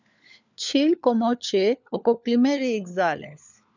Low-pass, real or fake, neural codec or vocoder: 7.2 kHz; fake; codec, 16 kHz, 4 kbps, FunCodec, trained on LibriTTS, 50 frames a second